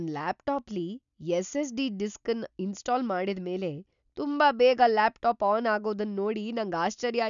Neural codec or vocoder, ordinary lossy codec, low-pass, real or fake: none; none; 7.2 kHz; real